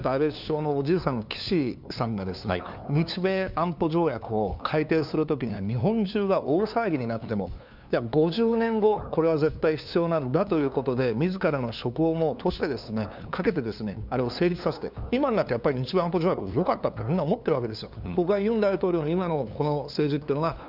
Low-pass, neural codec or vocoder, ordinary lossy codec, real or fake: 5.4 kHz; codec, 16 kHz, 2 kbps, FunCodec, trained on LibriTTS, 25 frames a second; none; fake